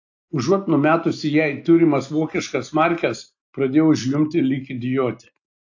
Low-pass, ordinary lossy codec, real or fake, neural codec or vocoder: 7.2 kHz; AAC, 48 kbps; real; none